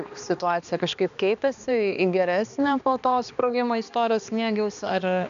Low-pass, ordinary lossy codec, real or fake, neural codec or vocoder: 7.2 kHz; MP3, 96 kbps; fake; codec, 16 kHz, 2 kbps, X-Codec, HuBERT features, trained on balanced general audio